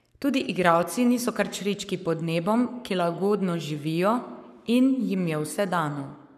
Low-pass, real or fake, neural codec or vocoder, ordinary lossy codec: 14.4 kHz; fake; codec, 44.1 kHz, 7.8 kbps, Pupu-Codec; none